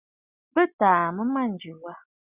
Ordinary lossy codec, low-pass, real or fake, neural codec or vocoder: Opus, 64 kbps; 3.6 kHz; real; none